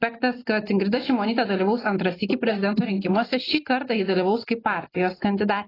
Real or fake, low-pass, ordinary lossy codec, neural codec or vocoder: real; 5.4 kHz; AAC, 24 kbps; none